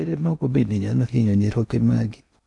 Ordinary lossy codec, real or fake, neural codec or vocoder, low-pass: none; fake; codec, 16 kHz in and 24 kHz out, 0.6 kbps, FocalCodec, streaming, 4096 codes; 10.8 kHz